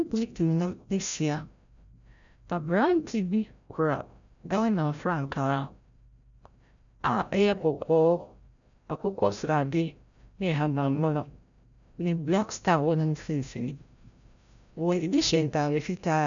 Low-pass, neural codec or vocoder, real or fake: 7.2 kHz; codec, 16 kHz, 0.5 kbps, FreqCodec, larger model; fake